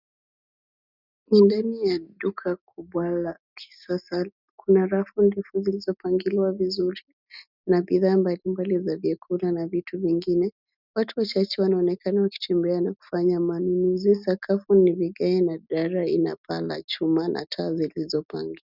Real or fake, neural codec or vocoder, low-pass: real; none; 5.4 kHz